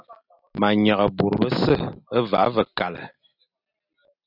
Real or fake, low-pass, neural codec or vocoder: real; 5.4 kHz; none